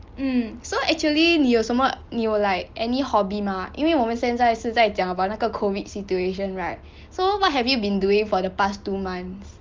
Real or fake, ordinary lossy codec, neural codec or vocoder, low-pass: real; Opus, 32 kbps; none; 7.2 kHz